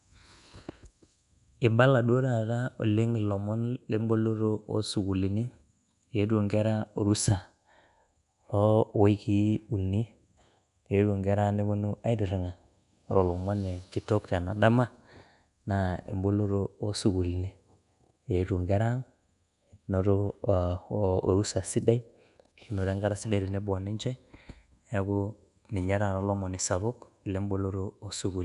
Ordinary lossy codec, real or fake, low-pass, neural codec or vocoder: none; fake; 10.8 kHz; codec, 24 kHz, 1.2 kbps, DualCodec